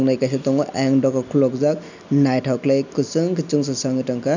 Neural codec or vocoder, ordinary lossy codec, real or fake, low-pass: none; none; real; 7.2 kHz